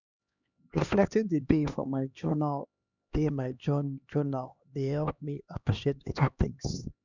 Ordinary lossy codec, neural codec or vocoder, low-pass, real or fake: none; codec, 16 kHz, 2 kbps, X-Codec, HuBERT features, trained on LibriSpeech; 7.2 kHz; fake